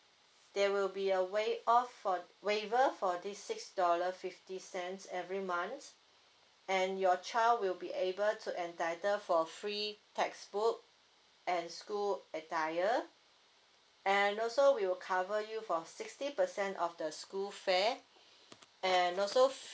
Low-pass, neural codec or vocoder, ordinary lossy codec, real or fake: none; none; none; real